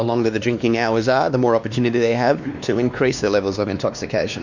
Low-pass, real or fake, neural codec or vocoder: 7.2 kHz; fake; codec, 16 kHz, 2 kbps, X-Codec, WavLM features, trained on Multilingual LibriSpeech